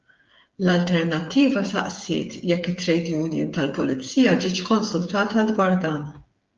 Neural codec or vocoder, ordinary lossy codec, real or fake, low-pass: codec, 16 kHz, 8 kbps, FreqCodec, smaller model; Opus, 32 kbps; fake; 7.2 kHz